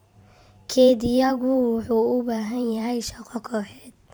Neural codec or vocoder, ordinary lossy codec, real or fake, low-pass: vocoder, 44.1 kHz, 128 mel bands every 512 samples, BigVGAN v2; none; fake; none